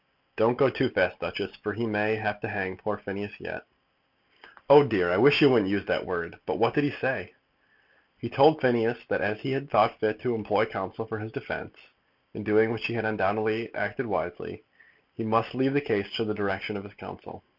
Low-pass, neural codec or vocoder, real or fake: 5.4 kHz; none; real